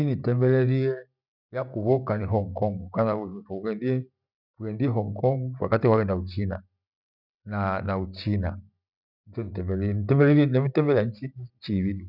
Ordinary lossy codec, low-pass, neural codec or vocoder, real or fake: none; 5.4 kHz; none; real